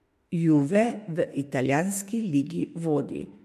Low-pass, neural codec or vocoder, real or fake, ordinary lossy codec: 14.4 kHz; autoencoder, 48 kHz, 32 numbers a frame, DAC-VAE, trained on Japanese speech; fake; MP3, 64 kbps